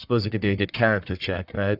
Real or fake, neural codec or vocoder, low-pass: fake; codec, 44.1 kHz, 1.7 kbps, Pupu-Codec; 5.4 kHz